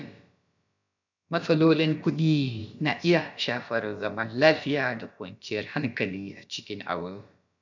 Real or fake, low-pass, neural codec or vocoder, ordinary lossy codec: fake; 7.2 kHz; codec, 16 kHz, about 1 kbps, DyCAST, with the encoder's durations; none